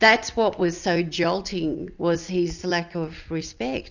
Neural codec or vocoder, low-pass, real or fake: none; 7.2 kHz; real